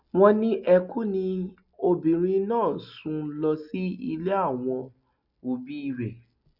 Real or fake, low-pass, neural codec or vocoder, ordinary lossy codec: real; 5.4 kHz; none; none